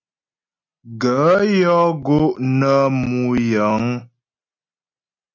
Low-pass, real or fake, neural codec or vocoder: 7.2 kHz; real; none